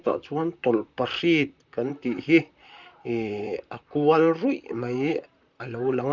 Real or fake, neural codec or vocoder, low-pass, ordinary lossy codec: fake; vocoder, 44.1 kHz, 128 mel bands, Pupu-Vocoder; 7.2 kHz; Opus, 64 kbps